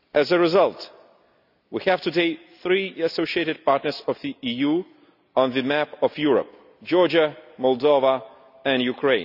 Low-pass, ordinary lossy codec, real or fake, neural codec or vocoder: 5.4 kHz; none; real; none